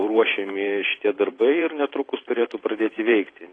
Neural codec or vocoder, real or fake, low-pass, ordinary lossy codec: vocoder, 44.1 kHz, 128 mel bands every 512 samples, BigVGAN v2; fake; 9.9 kHz; AAC, 32 kbps